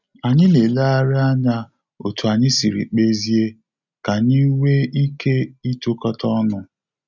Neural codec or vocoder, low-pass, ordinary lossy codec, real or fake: none; 7.2 kHz; none; real